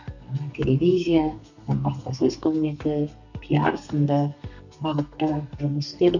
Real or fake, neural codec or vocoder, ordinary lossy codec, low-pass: fake; codec, 32 kHz, 1.9 kbps, SNAC; none; 7.2 kHz